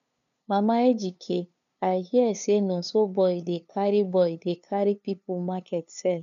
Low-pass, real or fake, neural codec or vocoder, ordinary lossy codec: 7.2 kHz; fake; codec, 16 kHz, 2 kbps, FunCodec, trained on LibriTTS, 25 frames a second; MP3, 96 kbps